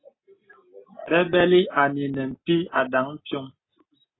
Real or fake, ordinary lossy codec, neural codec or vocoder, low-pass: real; AAC, 16 kbps; none; 7.2 kHz